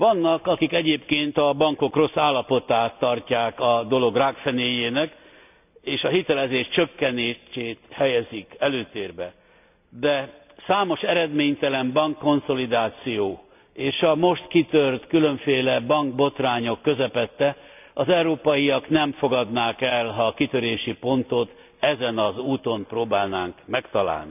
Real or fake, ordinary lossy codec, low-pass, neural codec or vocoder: real; none; 3.6 kHz; none